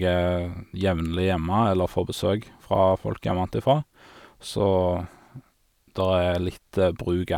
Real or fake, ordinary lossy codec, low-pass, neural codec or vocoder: real; none; 19.8 kHz; none